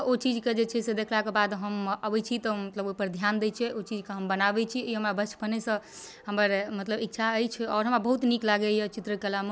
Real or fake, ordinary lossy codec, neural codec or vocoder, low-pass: real; none; none; none